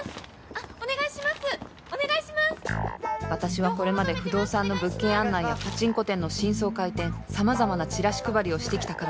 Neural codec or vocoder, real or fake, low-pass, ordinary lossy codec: none; real; none; none